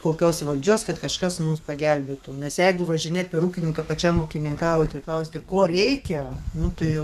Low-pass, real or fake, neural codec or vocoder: 14.4 kHz; fake; codec, 32 kHz, 1.9 kbps, SNAC